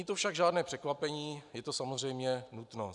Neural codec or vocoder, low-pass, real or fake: none; 10.8 kHz; real